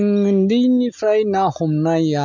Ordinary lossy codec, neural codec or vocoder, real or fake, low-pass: none; none; real; 7.2 kHz